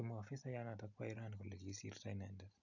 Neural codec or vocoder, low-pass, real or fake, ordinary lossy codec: none; 7.2 kHz; real; none